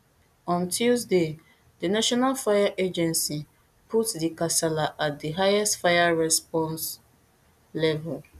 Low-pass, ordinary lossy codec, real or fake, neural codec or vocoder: 14.4 kHz; none; real; none